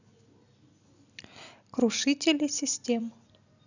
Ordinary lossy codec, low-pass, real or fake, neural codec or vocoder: none; 7.2 kHz; real; none